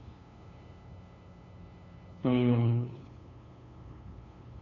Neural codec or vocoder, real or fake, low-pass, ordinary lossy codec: codec, 16 kHz, 2 kbps, FunCodec, trained on LibriTTS, 25 frames a second; fake; 7.2 kHz; none